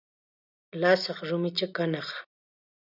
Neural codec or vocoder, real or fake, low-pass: none; real; 5.4 kHz